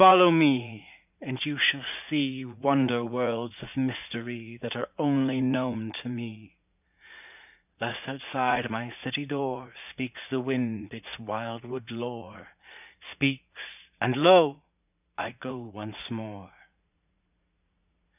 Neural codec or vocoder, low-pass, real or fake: vocoder, 44.1 kHz, 80 mel bands, Vocos; 3.6 kHz; fake